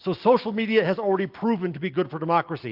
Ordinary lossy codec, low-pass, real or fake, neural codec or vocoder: Opus, 32 kbps; 5.4 kHz; real; none